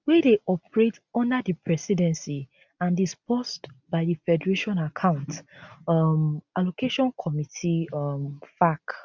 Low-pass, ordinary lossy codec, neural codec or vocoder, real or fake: 7.2 kHz; none; none; real